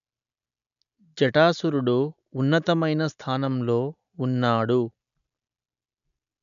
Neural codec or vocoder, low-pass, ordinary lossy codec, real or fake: none; 7.2 kHz; none; real